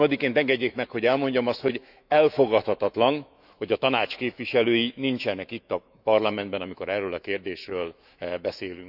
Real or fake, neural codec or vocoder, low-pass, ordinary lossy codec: fake; autoencoder, 48 kHz, 128 numbers a frame, DAC-VAE, trained on Japanese speech; 5.4 kHz; none